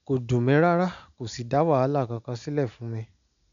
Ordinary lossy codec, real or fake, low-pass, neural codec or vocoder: none; real; 7.2 kHz; none